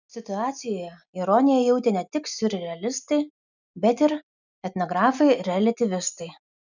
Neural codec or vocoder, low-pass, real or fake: none; 7.2 kHz; real